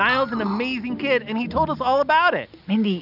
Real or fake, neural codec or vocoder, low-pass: real; none; 5.4 kHz